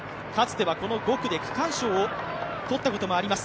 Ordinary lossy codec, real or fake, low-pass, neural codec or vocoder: none; real; none; none